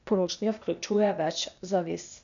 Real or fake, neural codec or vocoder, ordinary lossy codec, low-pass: fake; codec, 16 kHz, 0.8 kbps, ZipCodec; none; 7.2 kHz